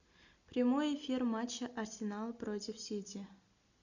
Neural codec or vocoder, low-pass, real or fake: none; 7.2 kHz; real